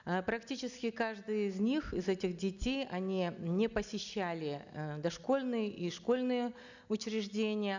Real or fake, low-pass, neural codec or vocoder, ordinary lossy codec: real; 7.2 kHz; none; none